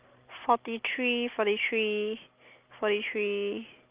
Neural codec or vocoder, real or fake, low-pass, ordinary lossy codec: none; real; 3.6 kHz; Opus, 24 kbps